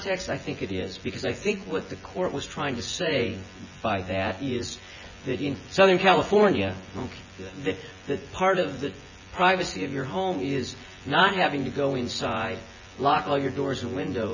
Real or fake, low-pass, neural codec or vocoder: fake; 7.2 kHz; vocoder, 24 kHz, 100 mel bands, Vocos